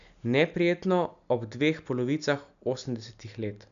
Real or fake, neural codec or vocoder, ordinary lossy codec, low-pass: real; none; none; 7.2 kHz